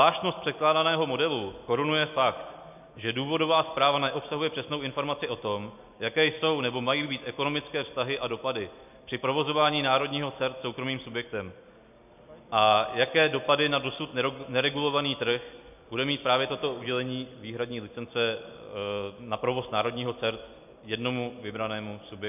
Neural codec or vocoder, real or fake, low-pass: none; real; 3.6 kHz